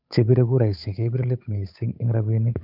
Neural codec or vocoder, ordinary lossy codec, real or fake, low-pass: codec, 16 kHz, 8 kbps, FunCodec, trained on Chinese and English, 25 frames a second; none; fake; 5.4 kHz